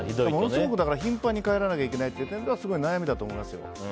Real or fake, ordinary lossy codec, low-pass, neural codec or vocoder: real; none; none; none